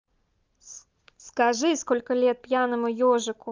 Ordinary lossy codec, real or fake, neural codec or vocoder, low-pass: Opus, 32 kbps; real; none; 7.2 kHz